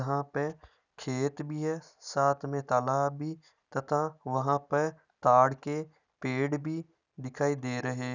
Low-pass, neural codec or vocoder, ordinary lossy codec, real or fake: 7.2 kHz; none; none; real